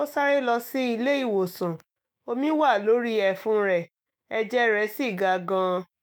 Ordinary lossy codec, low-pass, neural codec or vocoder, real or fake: none; none; autoencoder, 48 kHz, 128 numbers a frame, DAC-VAE, trained on Japanese speech; fake